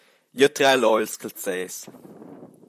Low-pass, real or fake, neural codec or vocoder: 14.4 kHz; fake; vocoder, 44.1 kHz, 128 mel bands, Pupu-Vocoder